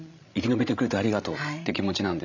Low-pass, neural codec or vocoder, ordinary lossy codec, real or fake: 7.2 kHz; codec, 16 kHz, 16 kbps, FreqCodec, larger model; none; fake